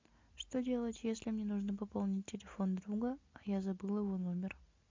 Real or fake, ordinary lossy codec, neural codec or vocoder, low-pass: real; MP3, 64 kbps; none; 7.2 kHz